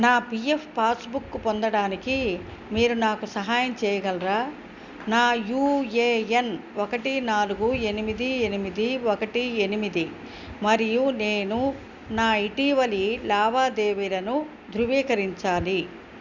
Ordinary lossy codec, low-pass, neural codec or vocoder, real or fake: Opus, 64 kbps; 7.2 kHz; none; real